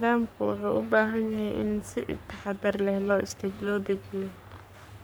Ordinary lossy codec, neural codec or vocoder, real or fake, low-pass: none; codec, 44.1 kHz, 3.4 kbps, Pupu-Codec; fake; none